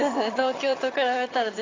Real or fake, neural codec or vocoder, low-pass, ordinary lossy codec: fake; vocoder, 22.05 kHz, 80 mel bands, HiFi-GAN; 7.2 kHz; AAC, 32 kbps